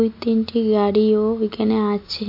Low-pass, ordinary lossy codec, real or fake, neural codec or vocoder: 5.4 kHz; MP3, 32 kbps; real; none